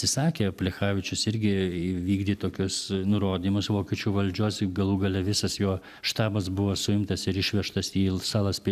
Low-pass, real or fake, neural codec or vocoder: 14.4 kHz; real; none